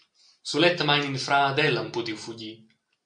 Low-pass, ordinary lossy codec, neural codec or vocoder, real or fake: 9.9 kHz; MP3, 64 kbps; none; real